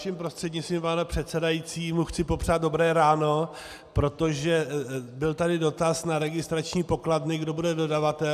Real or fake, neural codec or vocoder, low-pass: real; none; 14.4 kHz